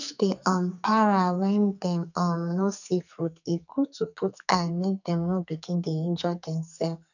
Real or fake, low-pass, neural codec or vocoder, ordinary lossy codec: fake; 7.2 kHz; codec, 44.1 kHz, 2.6 kbps, SNAC; none